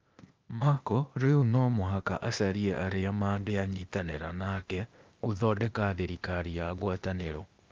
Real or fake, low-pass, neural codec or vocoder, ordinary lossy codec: fake; 7.2 kHz; codec, 16 kHz, 0.8 kbps, ZipCodec; Opus, 24 kbps